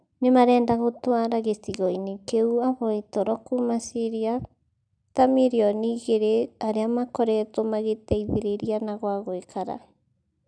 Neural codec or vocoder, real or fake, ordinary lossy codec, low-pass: none; real; none; 9.9 kHz